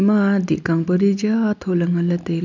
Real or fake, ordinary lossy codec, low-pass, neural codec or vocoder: real; none; 7.2 kHz; none